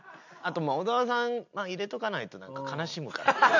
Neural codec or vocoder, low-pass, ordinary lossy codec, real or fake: none; 7.2 kHz; none; real